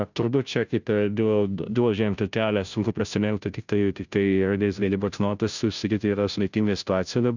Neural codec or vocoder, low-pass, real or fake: codec, 16 kHz, 0.5 kbps, FunCodec, trained on Chinese and English, 25 frames a second; 7.2 kHz; fake